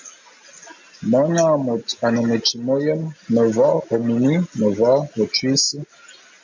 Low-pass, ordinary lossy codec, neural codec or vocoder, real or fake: 7.2 kHz; MP3, 64 kbps; none; real